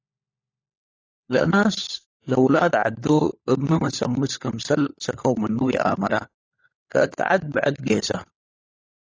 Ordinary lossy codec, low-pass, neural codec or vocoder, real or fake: AAC, 32 kbps; 7.2 kHz; codec, 16 kHz, 4 kbps, FunCodec, trained on LibriTTS, 50 frames a second; fake